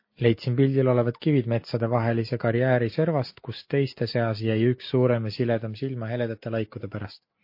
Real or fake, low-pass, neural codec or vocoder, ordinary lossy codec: real; 5.4 kHz; none; MP3, 32 kbps